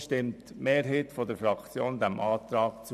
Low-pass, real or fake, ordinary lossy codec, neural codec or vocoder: 14.4 kHz; fake; none; vocoder, 44.1 kHz, 128 mel bands every 512 samples, BigVGAN v2